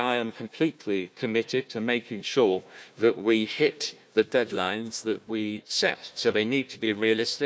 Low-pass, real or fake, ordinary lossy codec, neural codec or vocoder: none; fake; none; codec, 16 kHz, 1 kbps, FunCodec, trained on Chinese and English, 50 frames a second